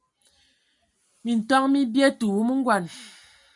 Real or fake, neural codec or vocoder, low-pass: real; none; 10.8 kHz